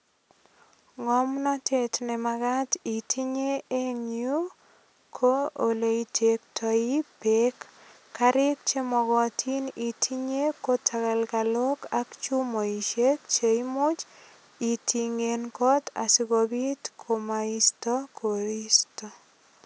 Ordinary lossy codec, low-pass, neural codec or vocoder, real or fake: none; none; none; real